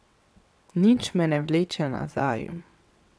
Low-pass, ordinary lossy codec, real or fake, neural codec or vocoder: none; none; fake; vocoder, 22.05 kHz, 80 mel bands, WaveNeXt